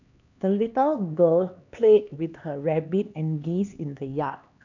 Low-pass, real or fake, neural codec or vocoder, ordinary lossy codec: 7.2 kHz; fake; codec, 16 kHz, 2 kbps, X-Codec, HuBERT features, trained on LibriSpeech; none